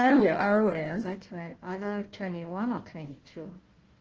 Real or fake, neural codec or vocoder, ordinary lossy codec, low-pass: fake; codec, 16 kHz, 1 kbps, FunCodec, trained on Chinese and English, 50 frames a second; Opus, 16 kbps; 7.2 kHz